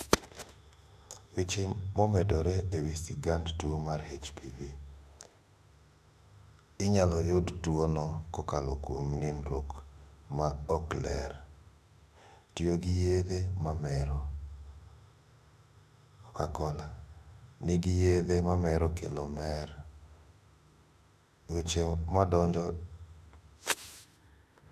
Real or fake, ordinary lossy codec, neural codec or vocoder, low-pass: fake; none; autoencoder, 48 kHz, 32 numbers a frame, DAC-VAE, trained on Japanese speech; 14.4 kHz